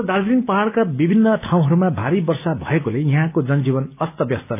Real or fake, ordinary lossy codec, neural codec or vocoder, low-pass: real; none; none; 3.6 kHz